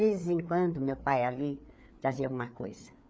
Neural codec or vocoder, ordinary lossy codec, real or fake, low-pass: codec, 16 kHz, 4 kbps, FreqCodec, larger model; none; fake; none